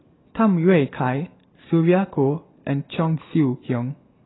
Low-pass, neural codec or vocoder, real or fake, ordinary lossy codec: 7.2 kHz; none; real; AAC, 16 kbps